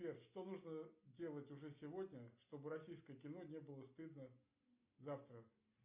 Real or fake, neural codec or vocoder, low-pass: real; none; 3.6 kHz